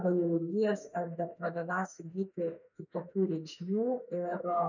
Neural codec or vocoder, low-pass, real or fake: autoencoder, 48 kHz, 32 numbers a frame, DAC-VAE, trained on Japanese speech; 7.2 kHz; fake